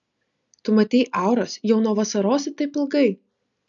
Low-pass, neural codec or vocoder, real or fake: 7.2 kHz; none; real